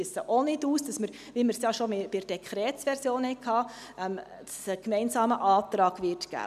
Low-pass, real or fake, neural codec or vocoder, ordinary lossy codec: 14.4 kHz; real; none; none